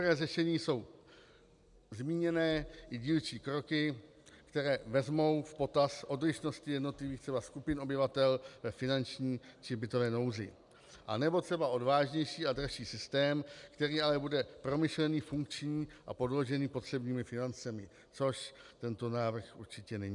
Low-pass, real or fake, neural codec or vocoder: 10.8 kHz; real; none